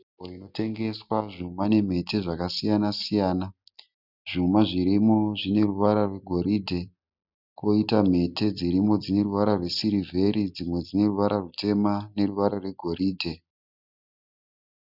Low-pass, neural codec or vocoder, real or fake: 5.4 kHz; none; real